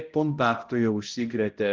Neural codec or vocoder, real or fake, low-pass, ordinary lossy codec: codec, 16 kHz, 0.5 kbps, X-Codec, HuBERT features, trained on balanced general audio; fake; 7.2 kHz; Opus, 16 kbps